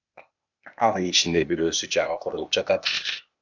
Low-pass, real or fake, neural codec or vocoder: 7.2 kHz; fake; codec, 16 kHz, 0.8 kbps, ZipCodec